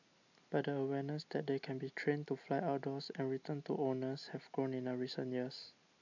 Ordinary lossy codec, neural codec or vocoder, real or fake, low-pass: none; none; real; 7.2 kHz